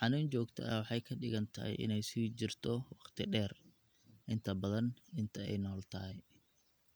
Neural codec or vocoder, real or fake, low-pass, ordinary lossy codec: none; real; none; none